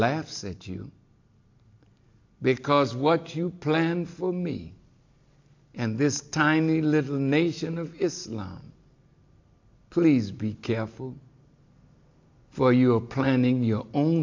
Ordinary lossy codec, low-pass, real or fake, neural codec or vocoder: AAC, 48 kbps; 7.2 kHz; real; none